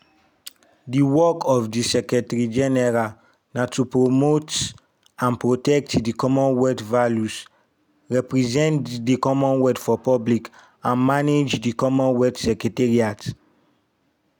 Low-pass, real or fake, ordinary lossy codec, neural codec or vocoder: 19.8 kHz; real; none; none